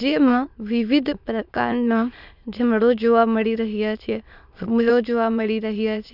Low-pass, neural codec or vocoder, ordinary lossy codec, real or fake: 5.4 kHz; autoencoder, 22.05 kHz, a latent of 192 numbers a frame, VITS, trained on many speakers; none; fake